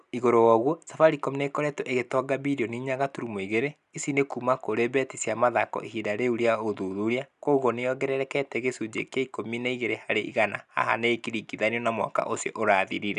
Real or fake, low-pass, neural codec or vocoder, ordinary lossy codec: real; 10.8 kHz; none; none